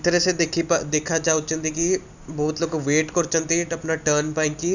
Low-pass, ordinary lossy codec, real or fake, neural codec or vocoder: 7.2 kHz; none; real; none